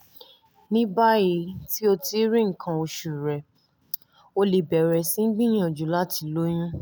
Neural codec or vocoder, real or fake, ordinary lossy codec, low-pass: none; real; none; none